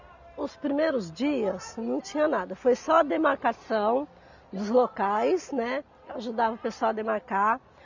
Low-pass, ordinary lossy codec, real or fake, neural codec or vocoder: 7.2 kHz; none; real; none